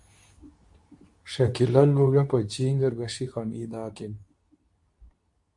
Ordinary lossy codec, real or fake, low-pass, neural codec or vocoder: AAC, 64 kbps; fake; 10.8 kHz; codec, 24 kHz, 0.9 kbps, WavTokenizer, medium speech release version 2